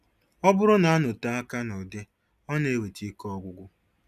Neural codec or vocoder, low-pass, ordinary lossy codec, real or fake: none; 14.4 kHz; none; real